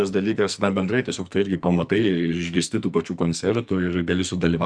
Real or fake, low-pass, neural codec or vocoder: fake; 9.9 kHz; codec, 44.1 kHz, 2.6 kbps, SNAC